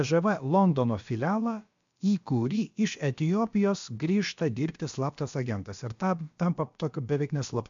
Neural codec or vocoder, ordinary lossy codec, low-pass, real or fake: codec, 16 kHz, about 1 kbps, DyCAST, with the encoder's durations; MP3, 64 kbps; 7.2 kHz; fake